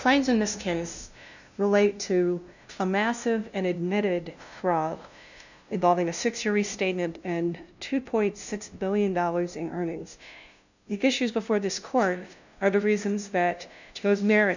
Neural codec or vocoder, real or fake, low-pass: codec, 16 kHz, 0.5 kbps, FunCodec, trained on LibriTTS, 25 frames a second; fake; 7.2 kHz